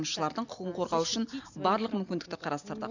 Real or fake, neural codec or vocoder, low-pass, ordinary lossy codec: real; none; 7.2 kHz; none